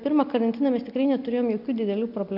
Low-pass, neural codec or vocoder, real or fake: 5.4 kHz; none; real